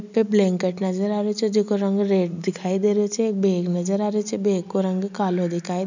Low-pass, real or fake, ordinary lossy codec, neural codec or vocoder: 7.2 kHz; real; none; none